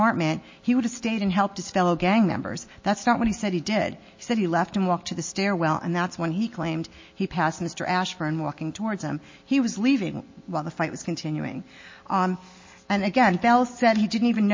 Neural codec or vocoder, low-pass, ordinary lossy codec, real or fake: none; 7.2 kHz; MP3, 32 kbps; real